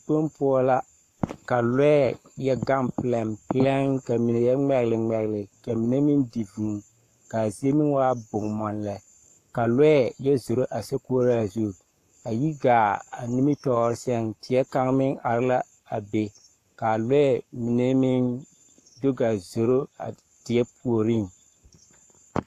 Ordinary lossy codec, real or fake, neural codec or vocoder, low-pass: AAC, 64 kbps; fake; codec, 44.1 kHz, 7.8 kbps, Pupu-Codec; 14.4 kHz